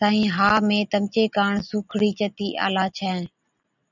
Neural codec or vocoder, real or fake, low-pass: none; real; 7.2 kHz